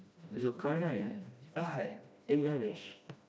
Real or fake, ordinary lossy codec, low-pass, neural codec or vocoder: fake; none; none; codec, 16 kHz, 1 kbps, FreqCodec, smaller model